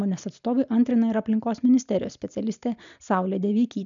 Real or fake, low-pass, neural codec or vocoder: real; 7.2 kHz; none